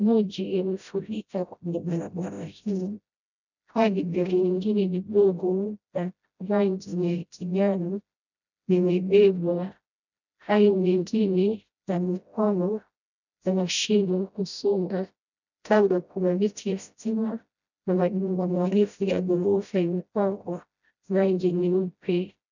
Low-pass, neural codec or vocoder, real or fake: 7.2 kHz; codec, 16 kHz, 0.5 kbps, FreqCodec, smaller model; fake